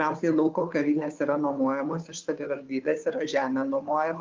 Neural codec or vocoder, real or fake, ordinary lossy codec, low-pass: codec, 16 kHz, 2 kbps, FunCodec, trained on Chinese and English, 25 frames a second; fake; Opus, 32 kbps; 7.2 kHz